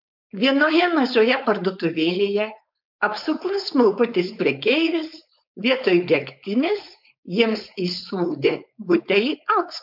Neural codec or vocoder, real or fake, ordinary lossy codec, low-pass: codec, 16 kHz, 4.8 kbps, FACodec; fake; MP3, 48 kbps; 5.4 kHz